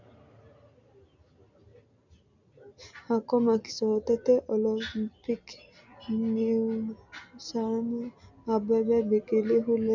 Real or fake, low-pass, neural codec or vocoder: real; 7.2 kHz; none